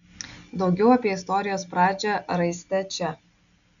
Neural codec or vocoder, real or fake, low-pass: none; real; 7.2 kHz